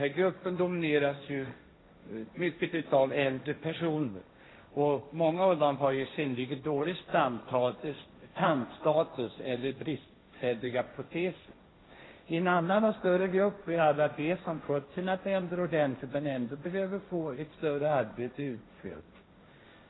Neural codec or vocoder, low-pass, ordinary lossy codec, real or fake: codec, 16 kHz, 1.1 kbps, Voila-Tokenizer; 7.2 kHz; AAC, 16 kbps; fake